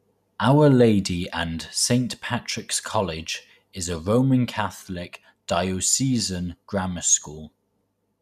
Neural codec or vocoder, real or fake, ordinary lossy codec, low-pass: none; real; none; 14.4 kHz